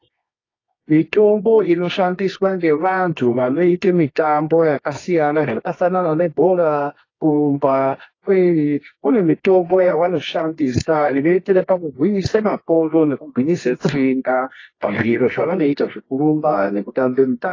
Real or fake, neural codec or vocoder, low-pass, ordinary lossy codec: fake; codec, 24 kHz, 0.9 kbps, WavTokenizer, medium music audio release; 7.2 kHz; AAC, 32 kbps